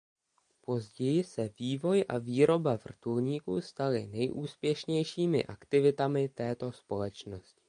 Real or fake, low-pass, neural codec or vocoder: real; 9.9 kHz; none